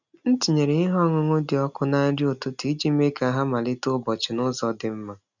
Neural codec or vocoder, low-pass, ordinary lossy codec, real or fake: none; 7.2 kHz; none; real